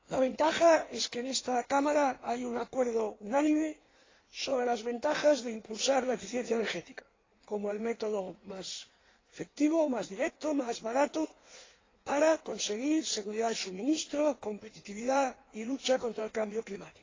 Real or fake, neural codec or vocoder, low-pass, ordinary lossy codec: fake; codec, 16 kHz in and 24 kHz out, 1.1 kbps, FireRedTTS-2 codec; 7.2 kHz; AAC, 32 kbps